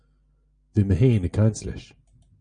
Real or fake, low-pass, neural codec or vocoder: real; 9.9 kHz; none